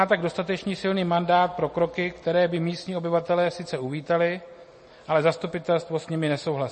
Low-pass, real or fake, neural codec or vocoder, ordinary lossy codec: 9.9 kHz; real; none; MP3, 32 kbps